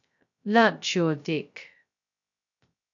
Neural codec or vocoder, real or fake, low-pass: codec, 16 kHz, 0.2 kbps, FocalCodec; fake; 7.2 kHz